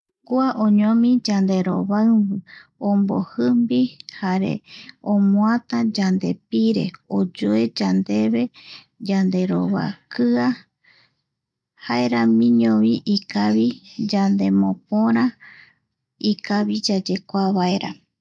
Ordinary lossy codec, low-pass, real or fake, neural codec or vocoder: none; none; real; none